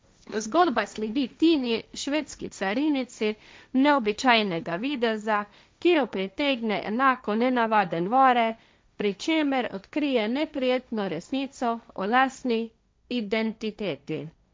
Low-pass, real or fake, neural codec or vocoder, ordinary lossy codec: none; fake; codec, 16 kHz, 1.1 kbps, Voila-Tokenizer; none